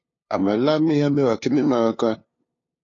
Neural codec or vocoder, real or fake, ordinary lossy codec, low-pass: codec, 16 kHz, 2 kbps, FunCodec, trained on LibriTTS, 25 frames a second; fake; AAC, 32 kbps; 7.2 kHz